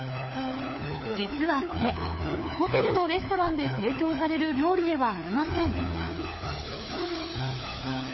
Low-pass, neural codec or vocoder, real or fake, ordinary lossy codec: 7.2 kHz; codec, 16 kHz, 4 kbps, FunCodec, trained on LibriTTS, 50 frames a second; fake; MP3, 24 kbps